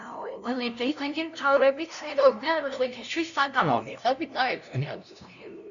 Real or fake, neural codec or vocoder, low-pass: fake; codec, 16 kHz, 0.5 kbps, FunCodec, trained on LibriTTS, 25 frames a second; 7.2 kHz